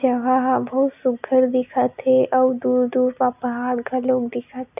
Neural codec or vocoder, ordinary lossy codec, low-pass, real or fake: none; none; 3.6 kHz; real